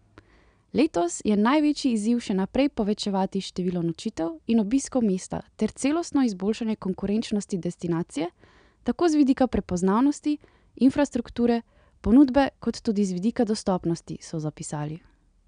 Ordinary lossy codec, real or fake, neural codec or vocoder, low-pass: none; real; none; 9.9 kHz